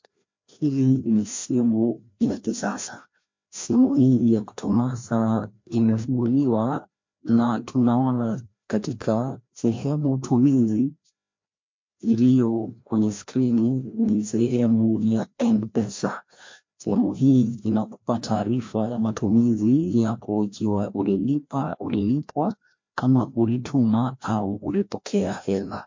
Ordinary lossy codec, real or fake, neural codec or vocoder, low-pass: MP3, 48 kbps; fake; codec, 16 kHz, 1 kbps, FreqCodec, larger model; 7.2 kHz